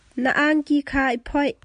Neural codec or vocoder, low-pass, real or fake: none; 9.9 kHz; real